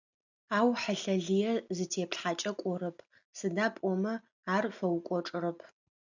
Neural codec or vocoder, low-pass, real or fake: none; 7.2 kHz; real